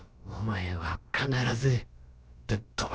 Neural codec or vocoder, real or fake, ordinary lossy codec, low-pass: codec, 16 kHz, about 1 kbps, DyCAST, with the encoder's durations; fake; none; none